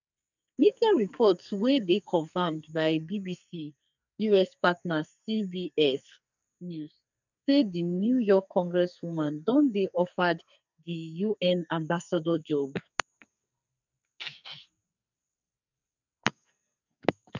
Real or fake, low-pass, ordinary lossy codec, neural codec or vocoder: fake; 7.2 kHz; none; codec, 44.1 kHz, 2.6 kbps, SNAC